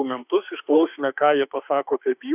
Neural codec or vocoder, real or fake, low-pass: autoencoder, 48 kHz, 32 numbers a frame, DAC-VAE, trained on Japanese speech; fake; 3.6 kHz